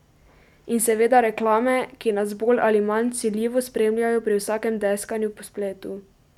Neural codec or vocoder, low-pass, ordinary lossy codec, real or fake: none; 19.8 kHz; none; real